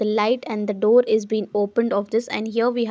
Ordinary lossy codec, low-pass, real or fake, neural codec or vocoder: none; none; real; none